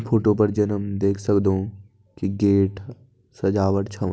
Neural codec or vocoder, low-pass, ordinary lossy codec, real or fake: none; none; none; real